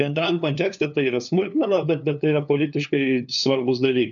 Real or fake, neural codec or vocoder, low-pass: fake; codec, 16 kHz, 2 kbps, FunCodec, trained on LibriTTS, 25 frames a second; 7.2 kHz